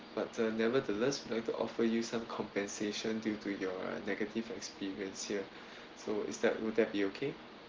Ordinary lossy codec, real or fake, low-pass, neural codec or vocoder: Opus, 16 kbps; real; 7.2 kHz; none